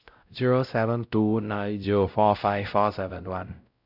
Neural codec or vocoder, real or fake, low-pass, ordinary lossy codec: codec, 16 kHz, 0.5 kbps, X-Codec, WavLM features, trained on Multilingual LibriSpeech; fake; 5.4 kHz; none